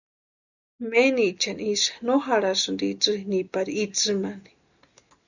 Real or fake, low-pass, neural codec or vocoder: real; 7.2 kHz; none